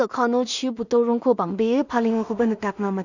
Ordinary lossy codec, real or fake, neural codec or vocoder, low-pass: none; fake; codec, 16 kHz in and 24 kHz out, 0.4 kbps, LongCat-Audio-Codec, two codebook decoder; 7.2 kHz